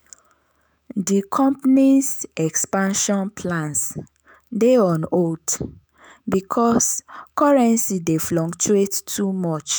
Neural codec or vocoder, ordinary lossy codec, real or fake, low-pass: autoencoder, 48 kHz, 128 numbers a frame, DAC-VAE, trained on Japanese speech; none; fake; none